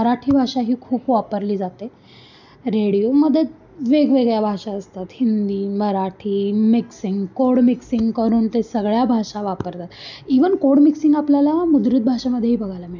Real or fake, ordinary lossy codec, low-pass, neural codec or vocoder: real; none; 7.2 kHz; none